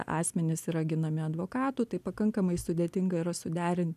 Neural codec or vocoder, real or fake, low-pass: none; real; 14.4 kHz